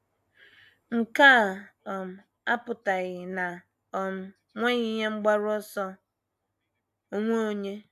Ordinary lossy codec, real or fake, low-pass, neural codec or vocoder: AAC, 96 kbps; real; 14.4 kHz; none